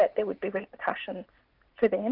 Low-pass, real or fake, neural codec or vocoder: 5.4 kHz; real; none